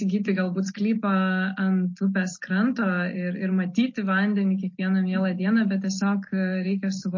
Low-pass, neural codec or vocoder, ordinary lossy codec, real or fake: 7.2 kHz; none; MP3, 32 kbps; real